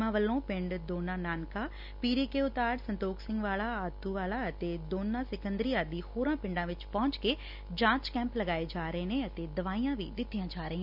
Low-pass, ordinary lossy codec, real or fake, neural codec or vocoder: 5.4 kHz; none; real; none